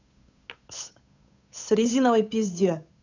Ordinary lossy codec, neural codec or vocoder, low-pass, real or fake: none; codec, 16 kHz, 8 kbps, FunCodec, trained on Chinese and English, 25 frames a second; 7.2 kHz; fake